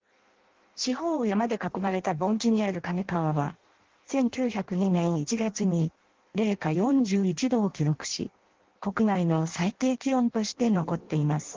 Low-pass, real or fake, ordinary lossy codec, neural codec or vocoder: 7.2 kHz; fake; Opus, 16 kbps; codec, 16 kHz in and 24 kHz out, 0.6 kbps, FireRedTTS-2 codec